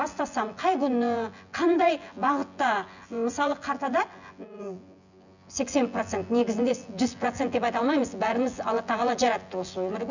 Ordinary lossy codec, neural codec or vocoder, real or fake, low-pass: none; vocoder, 24 kHz, 100 mel bands, Vocos; fake; 7.2 kHz